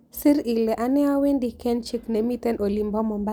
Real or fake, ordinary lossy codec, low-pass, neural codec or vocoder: real; none; none; none